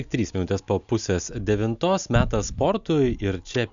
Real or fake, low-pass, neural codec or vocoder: real; 7.2 kHz; none